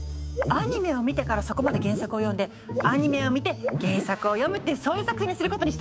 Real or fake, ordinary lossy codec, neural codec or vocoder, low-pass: fake; none; codec, 16 kHz, 6 kbps, DAC; none